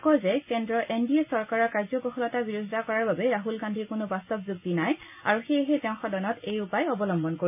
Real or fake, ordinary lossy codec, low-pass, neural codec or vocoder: real; none; 3.6 kHz; none